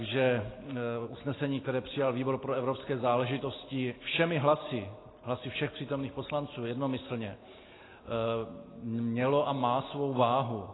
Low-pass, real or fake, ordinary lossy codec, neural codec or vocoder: 7.2 kHz; real; AAC, 16 kbps; none